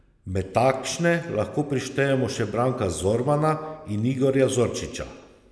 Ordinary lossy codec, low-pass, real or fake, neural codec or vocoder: none; none; real; none